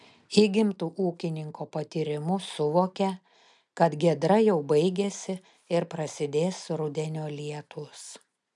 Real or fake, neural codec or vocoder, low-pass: real; none; 10.8 kHz